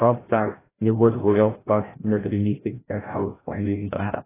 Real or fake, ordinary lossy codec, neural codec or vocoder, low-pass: fake; AAC, 16 kbps; codec, 16 kHz, 0.5 kbps, FreqCodec, larger model; 3.6 kHz